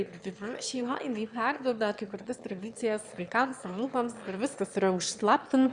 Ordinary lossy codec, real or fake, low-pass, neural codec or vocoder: Opus, 64 kbps; fake; 9.9 kHz; autoencoder, 22.05 kHz, a latent of 192 numbers a frame, VITS, trained on one speaker